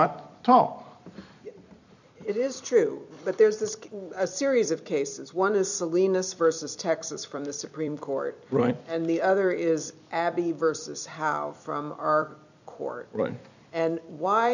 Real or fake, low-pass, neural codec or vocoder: fake; 7.2 kHz; vocoder, 44.1 kHz, 128 mel bands every 256 samples, BigVGAN v2